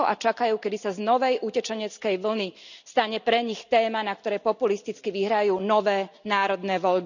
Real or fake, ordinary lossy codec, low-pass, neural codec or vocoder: real; none; 7.2 kHz; none